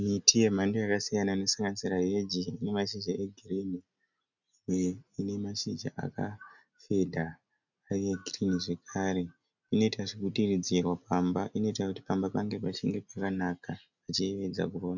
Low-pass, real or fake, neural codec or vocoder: 7.2 kHz; real; none